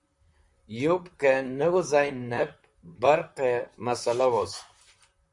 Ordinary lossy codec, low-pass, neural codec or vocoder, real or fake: MP3, 64 kbps; 10.8 kHz; vocoder, 44.1 kHz, 128 mel bands, Pupu-Vocoder; fake